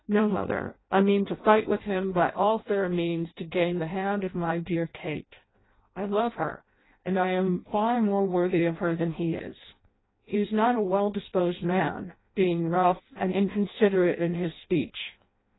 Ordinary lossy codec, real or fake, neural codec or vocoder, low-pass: AAC, 16 kbps; fake; codec, 16 kHz in and 24 kHz out, 0.6 kbps, FireRedTTS-2 codec; 7.2 kHz